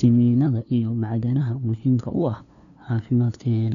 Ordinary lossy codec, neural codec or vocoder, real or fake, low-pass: none; codec, 16 kHz, 2 kbps, FunCodec, trained on LibriTTS, 25 frames a second; fake; 7.2 kHz